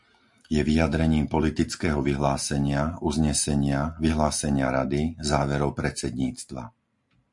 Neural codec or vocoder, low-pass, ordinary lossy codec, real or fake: none; 10.8 kHz; MP3, 64 kbps; real